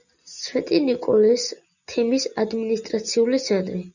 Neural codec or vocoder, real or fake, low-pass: none; real; 7.2 kHz